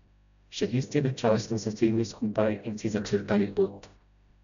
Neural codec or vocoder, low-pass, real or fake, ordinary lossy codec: codec, 16 kHz, 0.5 kbps, FreqCodec, smaller model; 7.2 kHz; fake; none